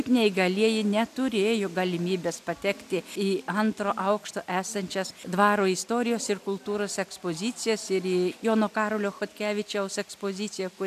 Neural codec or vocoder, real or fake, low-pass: none; real; 14.4 kHz